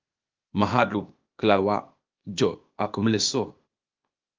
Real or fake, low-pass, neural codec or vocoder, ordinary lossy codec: fake; 7.2 kHz; codec, 16 kHz, 0.8 kbps, ZipCodec; Opus, 32 kbps